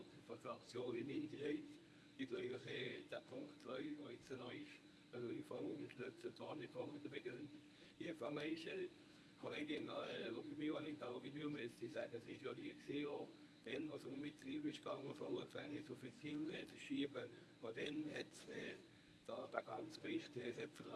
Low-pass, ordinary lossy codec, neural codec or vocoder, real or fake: none; none; codec, 24 kHz, 0.9 kbps, WavTokenizer, medium speech release version 1; fake